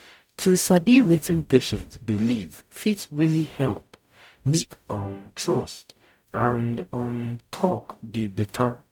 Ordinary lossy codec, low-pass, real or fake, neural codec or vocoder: none; 19.8 kHz; fake; codec, 44.1 kHz, 0.9 kbps, DAC